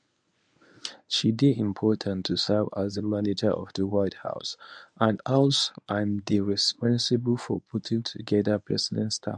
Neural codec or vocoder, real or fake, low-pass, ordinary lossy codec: codec, 24 kHz, 0.9 kbps, WavTokenizer, medium speech release version 1; fake; 9.9 kHz; none